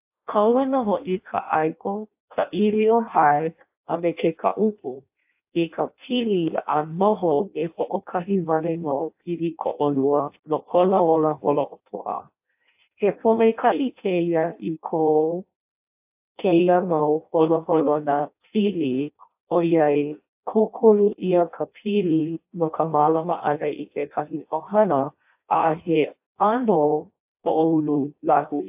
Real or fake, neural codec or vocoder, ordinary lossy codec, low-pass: fake; codec, 16 kHz in and 24 kHz out, 0.6 kbps, FireRedTTS-2 codec; AAC, 32 kbps; 3.6 kHz